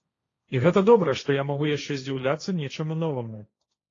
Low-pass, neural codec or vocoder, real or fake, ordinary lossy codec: 7.2 kHz; codec, 16 kHz, 1.1 kbps, Voila-Tokenizer; fake; AAC, 32 kbps